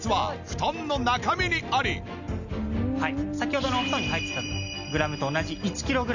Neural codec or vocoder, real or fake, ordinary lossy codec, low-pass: none; real; none; 7.2 kHz